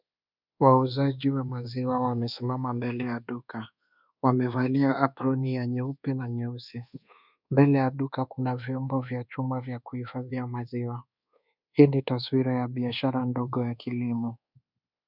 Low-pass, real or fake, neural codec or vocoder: 5.4 kHz; fake; codec, 24 kHz, 1.2 kbps, DualCodec